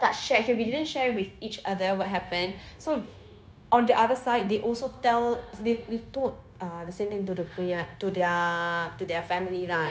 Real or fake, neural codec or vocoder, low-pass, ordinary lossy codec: fake; codec, 16 kHz, 0.9 kbps, LongCat-Audio-Codec; none; none